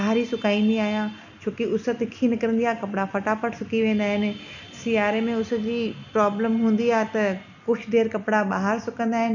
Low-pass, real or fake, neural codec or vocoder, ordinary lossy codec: 7.2 kHz; real; none; none